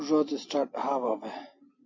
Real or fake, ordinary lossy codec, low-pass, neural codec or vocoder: real; MP3, 32 kbps; 7.2 kHz; none